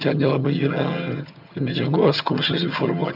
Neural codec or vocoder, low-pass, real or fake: vocoder, 22.05 kHz, 80 mel bands, HiFi-GAN; 5.4 kHz; fake